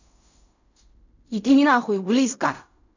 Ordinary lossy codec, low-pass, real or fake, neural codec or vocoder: none; 7.2 kHz; fake; codec, 16 kHz in and 24 kHz out, 0.4 kbps, LongCat-Audio-Codec, fine tuned four codebook decoder